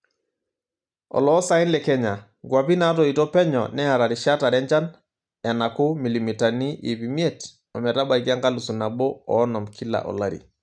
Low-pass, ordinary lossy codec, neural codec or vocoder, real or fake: 9.9 kHz; none; none; real